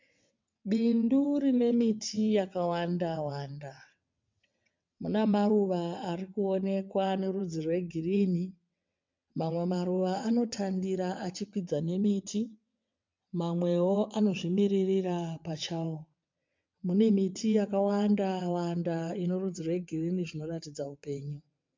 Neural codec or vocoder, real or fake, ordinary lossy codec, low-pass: vocoder, 22.05 kHz, 80 mel bands, WaveNeXt; fake; MP3, 64 kbps; 7.2 kHz